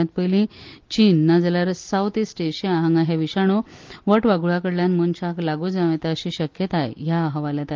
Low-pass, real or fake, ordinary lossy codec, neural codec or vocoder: 7.2 kHz; real; Opus, 32 kbps; none